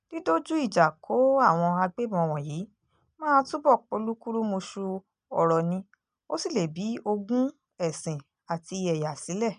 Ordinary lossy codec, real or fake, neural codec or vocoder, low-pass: none; real; none; 9.9 kHz